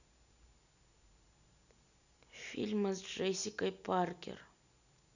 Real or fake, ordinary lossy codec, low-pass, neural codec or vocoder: real; none; 7.2 kHz; none